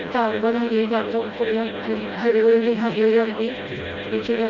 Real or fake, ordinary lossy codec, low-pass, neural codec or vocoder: fake; Opus, 64 kbps; 7.2 kHz; codec, 16 kHz, 0.5 kbps, FreqCodec, smaller model